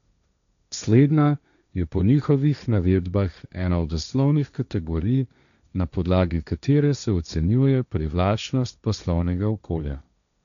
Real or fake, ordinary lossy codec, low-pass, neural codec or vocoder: fake; none; 7.2 kHz; codec, 16 kHz, 1.1 kbps, Voila-Tokenizer